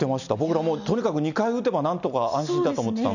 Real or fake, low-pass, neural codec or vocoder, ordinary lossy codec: real; 7.2 kHz; none; none